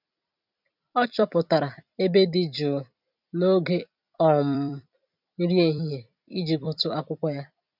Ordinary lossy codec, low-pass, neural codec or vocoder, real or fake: none; 5.4 kHz; vocoder, 44.1 kHz, 128 mel bands every 256 samples, BigVGAN v2; fake